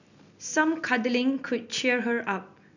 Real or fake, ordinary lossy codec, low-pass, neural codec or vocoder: real; none; 7.2 kHz; none